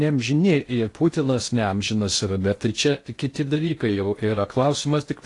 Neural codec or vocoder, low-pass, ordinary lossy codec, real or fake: codec, 16 kHz in and 24 kHz out, 0.6 kbps, FocalCodec, streaming, 2048 codes; 10.8 kHz; AAC, 48 kbps; fake